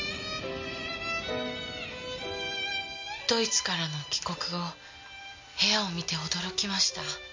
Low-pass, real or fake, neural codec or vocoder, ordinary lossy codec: 7.2 kHz; real; none; MP3, 48 kbps